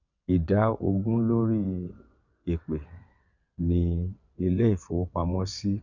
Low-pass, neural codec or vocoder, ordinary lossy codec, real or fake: 7.2 kHz; vocoder, 22.05 kHz, 80 mel bands, WaveNeXt; none; fake